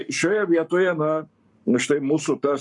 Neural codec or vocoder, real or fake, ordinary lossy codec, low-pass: codec, 44.1 kHz, 7.8 kbps, Pupu-Codec; fake; MP3, 96 kbps; 10.8 kHz